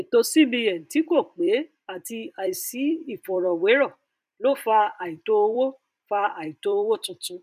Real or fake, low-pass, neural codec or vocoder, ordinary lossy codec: fake; 14.4 kHz; vocoder, 44.1 kHz, 128 mel bands every 256 samples, BigVGAN v2; none